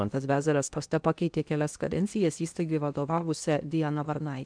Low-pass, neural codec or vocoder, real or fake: 9.9 kHz; codec, 16 kHz in and 24 kHz out, 0.8 kbps, FocalCodec, streaming, 65536 codes; fake